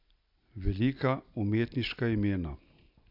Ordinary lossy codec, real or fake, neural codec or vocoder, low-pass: MP3, 48 kbps; real; none; 5.4 kHz